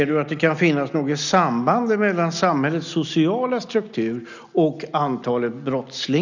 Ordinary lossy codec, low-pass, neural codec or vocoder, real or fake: none; 7.2 kHz; none; real